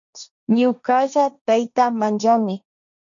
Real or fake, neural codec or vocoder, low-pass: fake; codec, 16 kHz, 1.1 kbps, Voila-Tokenizer; 7.2 kHz